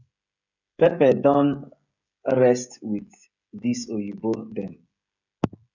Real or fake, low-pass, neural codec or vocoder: fake; 7.2 kHz; codec, 16 kHz, 16 kbps, FreqCodec, smaller model